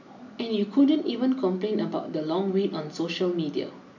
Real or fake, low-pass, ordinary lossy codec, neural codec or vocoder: real; 7.2 kHz; none; none